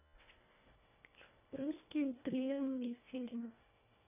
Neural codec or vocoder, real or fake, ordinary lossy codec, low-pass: codec, 24 kHz, 1.5 kbps, HILCodec; fake; none; 3.6 kHz